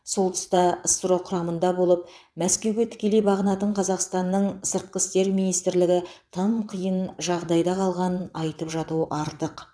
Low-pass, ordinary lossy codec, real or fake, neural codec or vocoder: none; none; fake; vocoder, 22.05 kHz, 80 mel bands, WaveNeXt